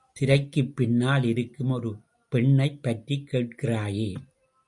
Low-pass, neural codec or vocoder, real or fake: 10.8 kHz; none; real